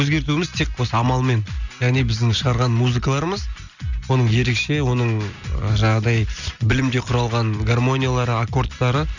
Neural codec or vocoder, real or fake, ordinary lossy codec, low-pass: none; real; none; 7.2 kHz